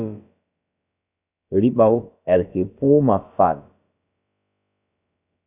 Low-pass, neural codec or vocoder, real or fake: 3.6 kHz; codec, 16 kHz, about 1 kbps, DyCAST, with the encoder's durations; fake